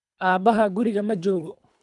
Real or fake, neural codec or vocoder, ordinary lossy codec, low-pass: fake; codec, 24 kHz, 3 kbps, HILCodec; none; 10.8 kHz